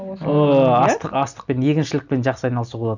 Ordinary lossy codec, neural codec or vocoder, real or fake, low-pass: none; none; real; 7.2 kHz